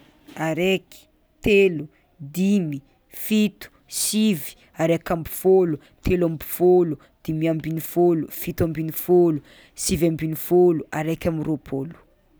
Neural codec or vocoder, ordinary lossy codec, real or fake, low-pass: none; none; real; none